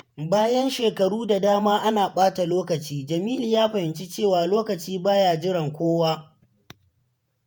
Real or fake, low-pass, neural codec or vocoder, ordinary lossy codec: fake; none; vocoder, 48 kHz, 128 mel bands, Vocos; none